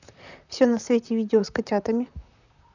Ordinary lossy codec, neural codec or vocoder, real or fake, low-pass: none; vocoder, 44.1 kHz, 128 mel bands, Pupu-Vocoder; fake; 7.2 kHz